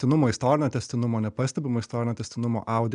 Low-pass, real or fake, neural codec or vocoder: 9.9 kHz; real; none